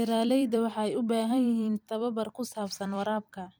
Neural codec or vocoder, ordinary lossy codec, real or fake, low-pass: vocoder, 44.1 kHz, 128 mel bands every 256 samples, BigVGAN v2; none; fake; none